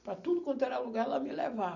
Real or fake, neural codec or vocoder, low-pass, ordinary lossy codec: real; none; 7.2 kHz; none